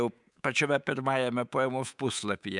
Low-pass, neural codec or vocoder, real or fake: 10.8 kHz; codec, 24 kHz, 3.1 kbps, DualCodec; fake